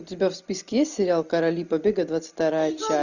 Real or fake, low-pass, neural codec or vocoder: real; 7.2 kHz; none